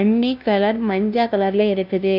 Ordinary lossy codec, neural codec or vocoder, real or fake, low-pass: none; codec, 16 kHz, 0.5 kbps, FunCodec, trained on LibriTTS, 25 frames a second; fake; 5.4 kHz